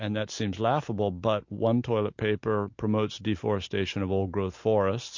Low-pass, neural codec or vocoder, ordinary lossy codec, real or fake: 7.2 kHz; none; MP3, 48 kbps; real